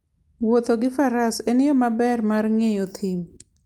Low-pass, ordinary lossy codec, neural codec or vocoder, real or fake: 19.8 kHz; Opus, 32 kbps; none; real